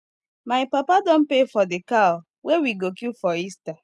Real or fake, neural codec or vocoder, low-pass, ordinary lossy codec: real; none; none; none